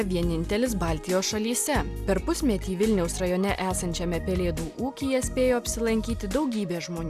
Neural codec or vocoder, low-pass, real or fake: none; 14.4 kHz; real